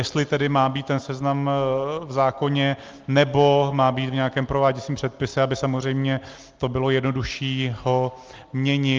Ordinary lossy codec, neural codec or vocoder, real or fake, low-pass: Opus, 32 kbps; none; real; 7.2 kHz